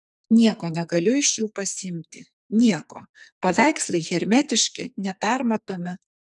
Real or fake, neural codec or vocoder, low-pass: fake; codec, 44.1 kHz, 2.6 kbps, SNAC; 10.8 kHz